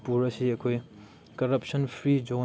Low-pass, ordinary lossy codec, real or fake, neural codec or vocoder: none; none; real; none